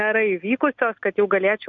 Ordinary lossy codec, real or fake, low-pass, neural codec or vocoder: MP3, 64 kbps; real; 7.2 kHz; none